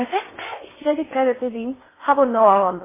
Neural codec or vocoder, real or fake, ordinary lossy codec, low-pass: codec, 16 kHz in and 24 kHz out, 0.6 kbps, FocalCodec, streaming, 4096 codes; fake; MP3, 16 kbps; 3.6 kHz